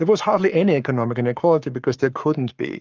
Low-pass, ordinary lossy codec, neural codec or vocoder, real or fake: 7.2 kHz; Opus, 32 kbps; codec, 44.1 kHz, 7.8 kbps, Pupu-Codec; fake